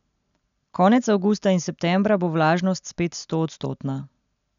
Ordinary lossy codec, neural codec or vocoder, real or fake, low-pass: none; none; real; 7.2 kHz